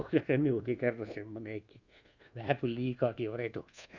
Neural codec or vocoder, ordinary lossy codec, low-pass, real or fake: codec, 24 kHz, 1.2 kbps, DualCodec; Opus, 64 kbps; 7.2 kHz; fake